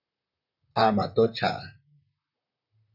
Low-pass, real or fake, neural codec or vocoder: 5.4 kHz; fake; vocoder, 44.1 kHz, 128 mel bands, Pupu-Vocoder